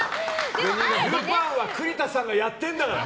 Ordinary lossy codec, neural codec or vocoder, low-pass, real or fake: none; none; none; real